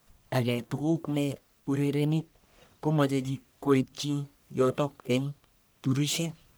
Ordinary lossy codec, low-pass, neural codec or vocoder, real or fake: none; none; codec, 44.1 kHz, 1.7 kbps, Pupu-Codec; fake